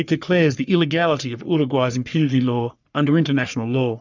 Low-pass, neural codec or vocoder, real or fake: 7.2 kHz; codec, 44.1 kHz, 3.4 kbps, Pupu-Codec; fake